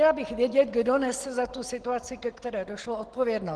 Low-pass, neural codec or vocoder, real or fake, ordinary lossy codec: 10.8 kHz; none; real; Opus, 16 kbps